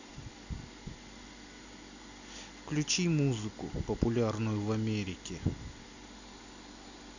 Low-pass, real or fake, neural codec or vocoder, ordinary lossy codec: 7.2 kHz; real; none; none